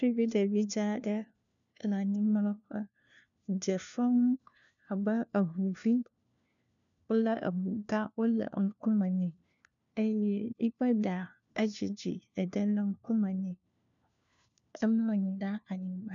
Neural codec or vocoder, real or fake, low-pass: codec, 16 kHz, 1 kbps, FunCodec, trained on LibriTTS, 50 frames a second; fake; 7.2 kHz